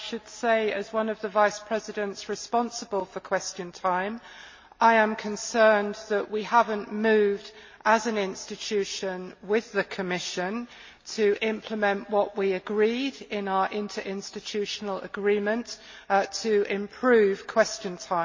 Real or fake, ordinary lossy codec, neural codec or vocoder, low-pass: real; MP3, 32 kbps; none; 7.2 kHz